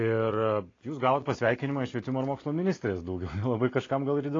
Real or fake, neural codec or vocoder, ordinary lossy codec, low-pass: real; none; AAC, 32 kbps; 7.2 kHz